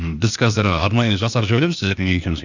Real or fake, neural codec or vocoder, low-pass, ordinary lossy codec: fake; codec, 16 kHz, 0.8 kbps, ZipCodec; 7.2 kHz; none